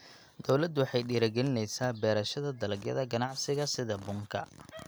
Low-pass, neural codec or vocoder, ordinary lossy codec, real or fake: none; none; none; real